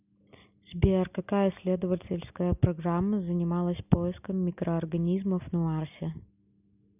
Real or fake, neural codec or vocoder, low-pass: real; none; 3.6 kHz